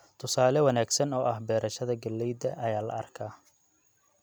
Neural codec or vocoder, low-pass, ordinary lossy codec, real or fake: none; none; none; real